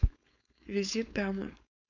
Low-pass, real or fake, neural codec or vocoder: 7.2 kHz; fake; codec, 16 kHz, 4.8 kbps, FACodec